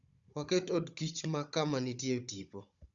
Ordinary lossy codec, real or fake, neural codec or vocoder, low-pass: Opus, 64 kbps; fake; codec, 16 kHz, 16 kbps, FunCodec, trained on Chinese and English, 50 frames a second; 7.2 kHz